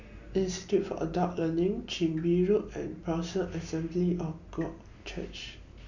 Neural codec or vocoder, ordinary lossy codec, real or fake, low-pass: none; none; real; 7.2 kHz